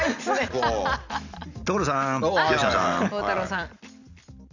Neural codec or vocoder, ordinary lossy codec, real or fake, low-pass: none; none; real; 7.2 kHz